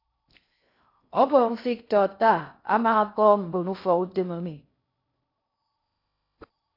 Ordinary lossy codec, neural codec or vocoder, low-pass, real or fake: AAC, 32 kbps; codec, 16 kHz in and 24 kHz out, 0.6 kbps, FocalCodec, streaming, 2048 codes; 5.4 kHz; fake